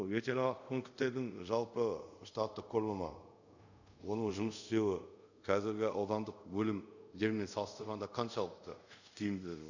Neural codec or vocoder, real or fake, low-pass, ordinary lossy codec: codec, 24 kHz, 0.5 kbps, DualCodec; fake; 7.2 kHz; none